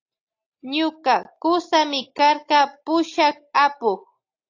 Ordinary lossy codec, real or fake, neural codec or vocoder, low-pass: AAC, 48 kbps; real; none; 7.2 kHz